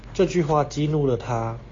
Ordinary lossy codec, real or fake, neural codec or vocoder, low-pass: AAC, 32 kbps; real; none; 7.2 kHz